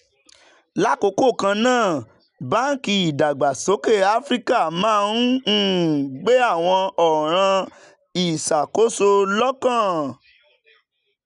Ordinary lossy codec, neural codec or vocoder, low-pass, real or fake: none; none; 10.8 kHz; real